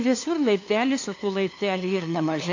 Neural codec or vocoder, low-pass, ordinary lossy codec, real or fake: codec, 16 kHz, 2 kbps, FunCodec, trained on LibriTTS, 25 frames a second; 7.2 kHz; AAC, 48 kbps; fake